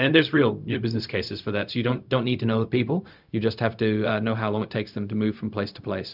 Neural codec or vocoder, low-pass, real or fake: codec, 16 kHz, 0.4 kbps, LongCat-Audio-Codec; 5.4 kHz; fake